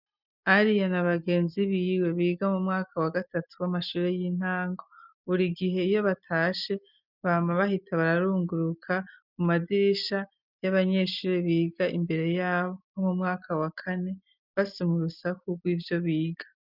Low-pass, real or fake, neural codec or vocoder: 5.4 kHz; real; none